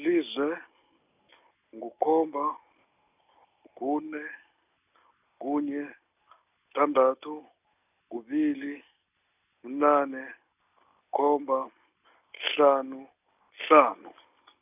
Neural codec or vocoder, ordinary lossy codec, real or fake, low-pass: none; none; real; 3.6 kHz